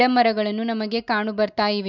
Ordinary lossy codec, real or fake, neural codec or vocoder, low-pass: none; real; none; 7.2 kHz